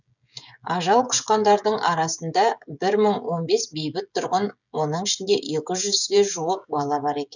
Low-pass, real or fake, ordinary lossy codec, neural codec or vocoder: 7.2 kHz; fake; none; codec, 16 kHz, 16 kbps, FreqCodec, smaller model